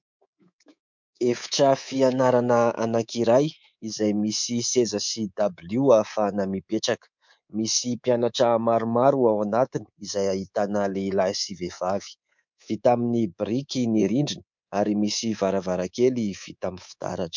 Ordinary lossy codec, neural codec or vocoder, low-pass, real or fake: MP3, 64 kbps; codec, 24 kHz, 3.1 kbps, DualCodec; 7.2 kHz; fake